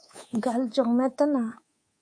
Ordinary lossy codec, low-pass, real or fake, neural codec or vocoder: MP3, 48 kbps; 9.9 kHz; fake; codec, 24 kHz, 3.1 kbps, DualCodec